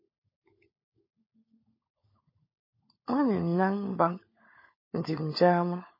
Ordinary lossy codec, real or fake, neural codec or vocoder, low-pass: MP3, 24 kbps; fake; codec, 16 kHz, 16 kbps, FunCodec, trained on LibriTTS, 50 frames a second; 5.4 kHz